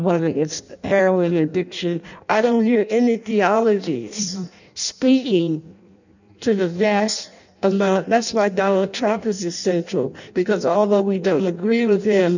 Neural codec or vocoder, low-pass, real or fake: codec, 16 kHz in and 24 kHz out, 0.6 kbps, FireRedTTS-2 codec; 7.2 kHz; fake